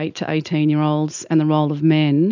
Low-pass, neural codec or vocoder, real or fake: 7.2 kHz; none; real